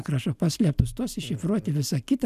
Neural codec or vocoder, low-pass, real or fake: none; 14.4 kHz; real